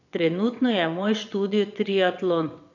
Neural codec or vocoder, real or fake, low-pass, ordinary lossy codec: vocoder, 22.05 kHz, 80 mel bands, WaveNeXt; fake; 7.2 kHz; none